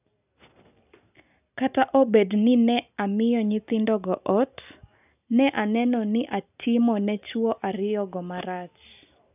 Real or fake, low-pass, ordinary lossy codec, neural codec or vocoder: real; 3.6 kHz; none; none